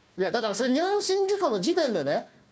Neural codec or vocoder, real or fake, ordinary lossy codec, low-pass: codec, 16 kHz, 1 kbps, FunCodec, trained on Chinese and English, 50 frames a second; fake; none; none